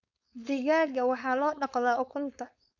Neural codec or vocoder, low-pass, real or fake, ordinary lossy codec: codec, 16 kHz, 4.8 kbps, FACodec; 7.2 kHz; fake; none